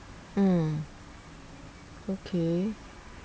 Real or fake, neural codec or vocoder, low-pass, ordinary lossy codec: real; none; none; none